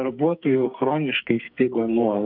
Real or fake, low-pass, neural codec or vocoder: fake; 5.4 kHz; codec, 44.1 kHz, 2.6 kbps, SNAC